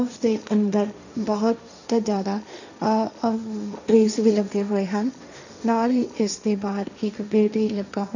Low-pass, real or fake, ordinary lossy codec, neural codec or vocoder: 7.2 kHz; fake; none; codec, 16 kHz, 1.1 kbps, Voila-Tokenizer